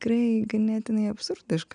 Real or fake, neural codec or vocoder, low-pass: real; none; 9.9 kHz